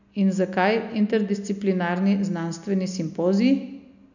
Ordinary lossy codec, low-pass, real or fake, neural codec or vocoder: none; 7.2 kHz; real; none